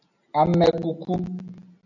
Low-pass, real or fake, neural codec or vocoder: 7.2 kHz; real; none